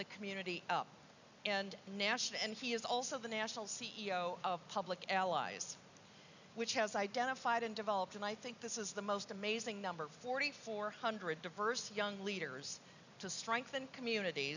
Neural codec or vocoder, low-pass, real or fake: none; 7.2 kHz; real